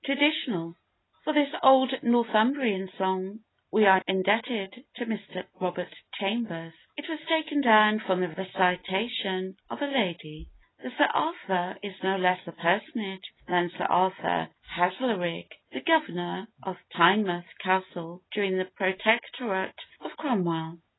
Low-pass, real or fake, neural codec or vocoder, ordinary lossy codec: 7.2 kHz; real; none; AAC, 16 kbps